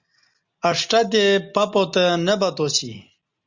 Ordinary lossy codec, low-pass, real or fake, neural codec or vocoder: Opus, 64 kbps; 7.2 kHz; real; none